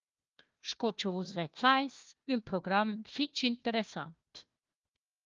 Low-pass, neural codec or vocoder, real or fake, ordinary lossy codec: 7.2 kHz; codec, 16 kHz, 1 kbps, FunCodec, trained on Chinese and English, 50 frames a second; fake; Opus, 32 kbps